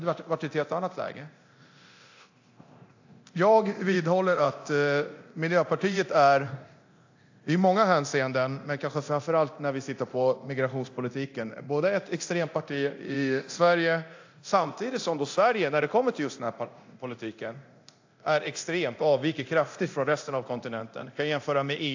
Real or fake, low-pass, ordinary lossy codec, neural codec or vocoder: fake; 7.2 kHz; AAC, 48 kbps; codec, 24 kHz, 0.9 kbps, DualCodec